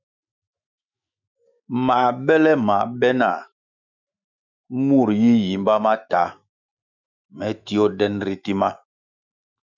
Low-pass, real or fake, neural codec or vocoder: 7.2 kHz; fake; autoencoder, 48 kHz, 128 numbers a frame, DAC-VAE, trained on Japanese speech